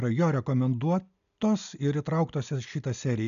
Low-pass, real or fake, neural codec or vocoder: 7.2 kHz; real; none